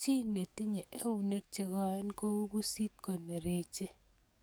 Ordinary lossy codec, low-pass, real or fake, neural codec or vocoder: none; none; fake; codec, 44.1 kHz, 7.8 kbps, DAC